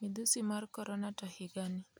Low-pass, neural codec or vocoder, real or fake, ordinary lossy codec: none; none; real; none